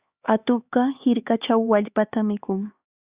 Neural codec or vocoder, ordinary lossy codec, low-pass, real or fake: codec, 16 kHz, 4 kbps, X-Codec, HuBERT features, trained on LibriSpeech; Opus, 64 kbps; 3.6 kHz; fake